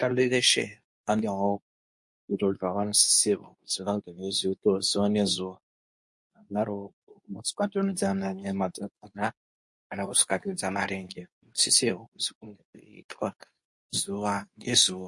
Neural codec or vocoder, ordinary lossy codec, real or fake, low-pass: codec, 24 kHz, 0.9 kbps, WavTokenizer, medium speech release version 2; MP3, 48 kbps; fake; 10.8 kHz